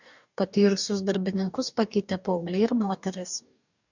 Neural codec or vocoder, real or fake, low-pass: codec, 44.1 kHz, 2.6 kbps, DAC; fake; 7.2 kHz